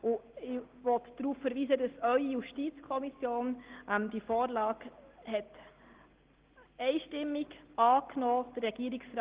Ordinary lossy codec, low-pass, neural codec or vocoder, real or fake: Opus, 16 kbps; 3.6 kHz; none; real